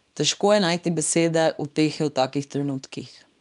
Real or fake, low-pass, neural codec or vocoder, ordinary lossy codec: fake; 10.8 kHz; codec, 24 kHz, 0.9 kbps, WavTokenizer, small release; none